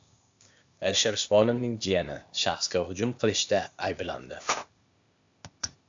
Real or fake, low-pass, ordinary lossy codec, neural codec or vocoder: fake; 7.2 kHz; AAC, 64 kbps; codec, 16 kHz, 0.8 kbps, ZipCodec